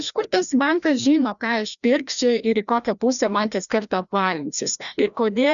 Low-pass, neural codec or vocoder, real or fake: 7.2 kHz; codec, 16 kHz, 1 kbps, FreqCodec, larger model; fake